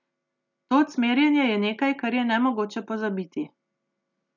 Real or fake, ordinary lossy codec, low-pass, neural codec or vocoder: real; none; 7.2 kHz; none